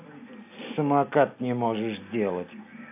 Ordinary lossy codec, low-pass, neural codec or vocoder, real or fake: AAC, 32 kbps; 3.6 kHz; none; real